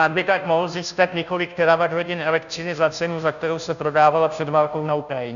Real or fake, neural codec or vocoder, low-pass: fake; codec, 16 kHz, 0.5 kbps, FunCodec, trained on Chinese and English, 25 frames a second; 7.2 kHz